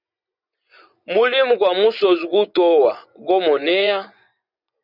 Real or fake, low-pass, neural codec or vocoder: fake; 5.4 kHz; vocoder, 24 kHz, 100 mel bands, Vocos